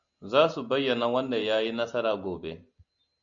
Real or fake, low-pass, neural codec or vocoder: real; 7.2 kHz; none